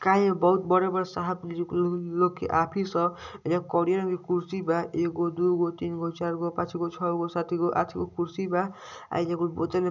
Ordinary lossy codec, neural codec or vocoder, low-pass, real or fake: none; none; 7.2 kHz; real